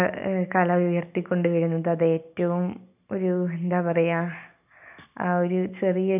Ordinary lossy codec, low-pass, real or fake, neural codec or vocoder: none; 3.6 kHz; real; none